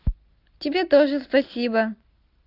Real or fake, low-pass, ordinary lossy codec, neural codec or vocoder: real; 5.4 kHz; Opus, 32 kbps; none